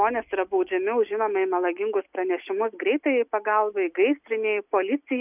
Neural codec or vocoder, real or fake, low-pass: none; real; 3.6 kHz